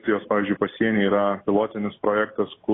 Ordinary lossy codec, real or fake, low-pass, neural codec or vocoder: AAC, 16 kbps; real; 7.2 kHz; none